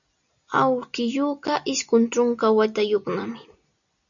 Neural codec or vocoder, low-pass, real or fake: none; 7.2 kHz; real